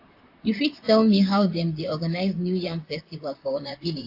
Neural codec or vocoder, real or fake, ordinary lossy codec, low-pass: vocoder, 44.1 kHz, 128 mel bands, Pupu-Vocoder; fake; AAC, 32 kbps; 5.4 kHz